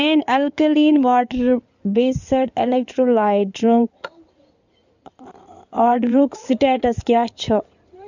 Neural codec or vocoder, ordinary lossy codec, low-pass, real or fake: codec, 16 kHz in and 24 kHz out, 2.2 kbps, FireRedTTS-2 codec; none; 7.2 kHz; fake